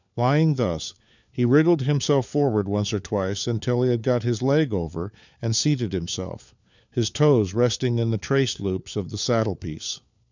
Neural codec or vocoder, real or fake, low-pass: codec, 16 kHz, 4 kbps, FunCodec, trained on LibriTTS, 50 frames a second; fake; 7.2 kHz